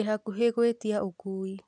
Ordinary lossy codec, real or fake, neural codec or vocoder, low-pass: none; real; none; 9.9 kHz